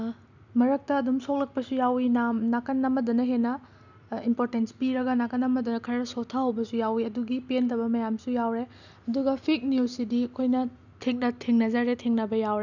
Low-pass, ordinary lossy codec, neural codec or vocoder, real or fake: 7.2 kHz; none; none; real